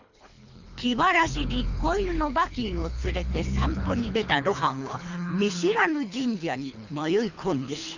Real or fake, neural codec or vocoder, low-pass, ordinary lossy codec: fake; codec, 24 kHz, 3 kbps, HILCodec; 7.2 kHz; none